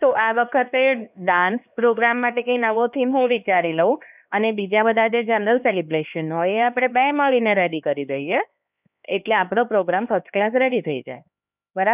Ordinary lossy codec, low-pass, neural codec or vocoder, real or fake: none; 3.6 kHz; codec, 16 kHz, 2 kbps, X-Codec, HuBERT features, trained on LibriSpeech; fake